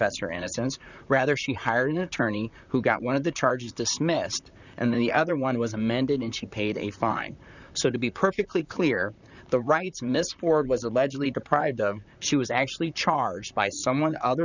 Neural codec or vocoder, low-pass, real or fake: vocoder, 44.1 kHz, 128 mel bands, Pupu-Vocoder; 7.2 kHz; fake